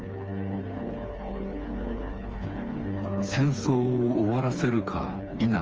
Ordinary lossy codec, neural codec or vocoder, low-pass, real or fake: Opus, 24 kbps; codec, 16 kHz, 4 kbps, FreqCodec, smaller model; 7.2 kHz; fake